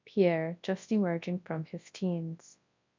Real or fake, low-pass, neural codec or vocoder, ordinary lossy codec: fake; 7.2 kHz; codec, 24 kHz, 0.9 kbps, WavTokenizer, large speech release; MP3, 64 kbps